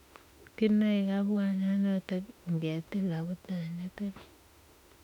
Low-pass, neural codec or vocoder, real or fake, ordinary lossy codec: 19.8 kHz; autoencoder, 48 kHz, 32 numbers a frame, DAC-VAE, trained on Japanese speech; fake; none